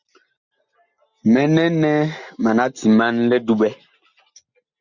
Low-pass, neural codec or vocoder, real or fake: 7.2 kHz; none; real